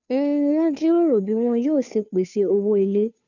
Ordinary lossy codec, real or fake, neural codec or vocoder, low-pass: none; fake; codec, 16 kHz, 2 kbps, FunCodec, trained on Chinese and English, 25 frames a second; 7.2 kHz